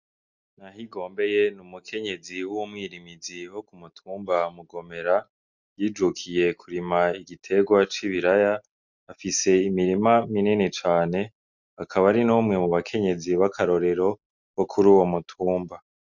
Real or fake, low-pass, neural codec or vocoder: real; 7.2 kHz; none